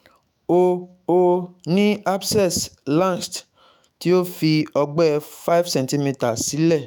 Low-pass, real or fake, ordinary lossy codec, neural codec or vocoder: none; fake; none; autoencoder, 48 kHz, 128 numbers a frame, DAC-VAE, trained on Japanese speech